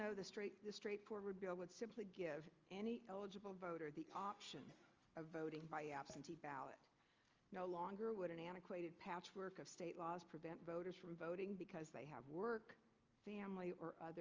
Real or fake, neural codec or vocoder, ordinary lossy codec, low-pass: real; none; Opus, 32 kbps; 7.2 kHz